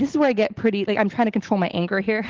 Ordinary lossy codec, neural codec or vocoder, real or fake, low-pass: Opus, 16 kbps; none; real; 7.2 kHz